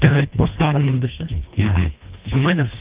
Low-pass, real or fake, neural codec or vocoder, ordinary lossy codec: 3.6 kHz; fake; codec, 24 kHz, 1.5 kbps, HILCodec; Opus, 32 kbps